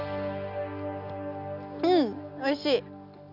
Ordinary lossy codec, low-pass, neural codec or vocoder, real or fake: none; 5.4 kHz; none; real